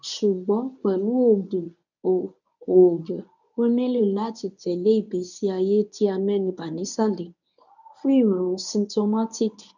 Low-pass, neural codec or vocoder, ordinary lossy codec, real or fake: 7.2 kHz; codec, 24 kHz, 0.9 kbps, WavTokenizer, medium speech release version 1; none; fake